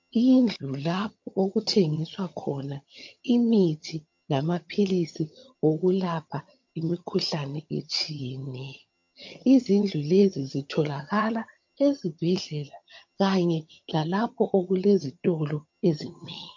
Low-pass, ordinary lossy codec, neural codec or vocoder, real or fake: 7.2 kHz; MP3, 48 kbps; vocoder, 22.05 kHz, 80 mel bands, HiFi-GAN; fake